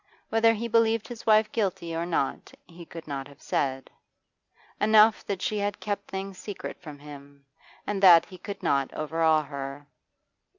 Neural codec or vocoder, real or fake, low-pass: none; real; 7.2 kHz